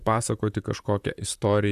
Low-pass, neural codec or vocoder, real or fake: 14.4 kHz; vocoder, 44.1 kHz, 128 mel bands, Pupu-Vocoder; fake